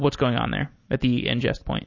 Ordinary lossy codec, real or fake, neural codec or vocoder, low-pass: MP3, 32 kbps; real; none; 7.2 kHz